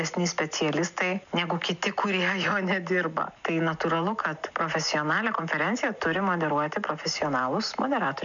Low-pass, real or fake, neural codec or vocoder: 7.2 kHz; real; none